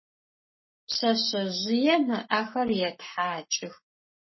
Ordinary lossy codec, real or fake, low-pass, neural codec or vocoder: MP3, 24 kbps; fake; 7.2 kHz; codec, 44.1 kHz, 7.8 kbps, Pupu-Codec